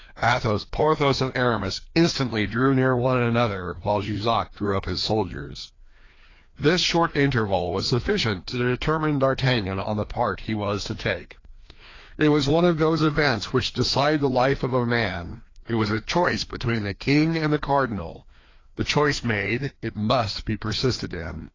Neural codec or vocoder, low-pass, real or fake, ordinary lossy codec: codec, 16 kHz, 2 kbps, FreqCodec, larger model; 7.2 kHz; fake; AAC, 32 kbps